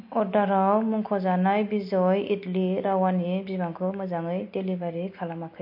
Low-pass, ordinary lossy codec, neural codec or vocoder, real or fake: 5.4 kHz; MP3, 32 kbps; none; real